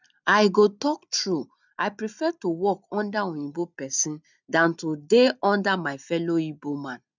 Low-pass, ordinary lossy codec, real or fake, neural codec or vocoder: 7.2 kHz; none; real; none